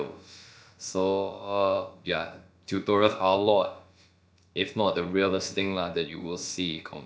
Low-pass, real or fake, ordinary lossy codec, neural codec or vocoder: none; fake; none; codec, 16 kHz, about 1 kbps, DyCAST, with the encoder's durations